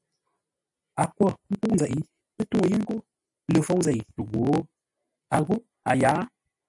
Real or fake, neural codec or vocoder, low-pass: real; none; 10.8 kHz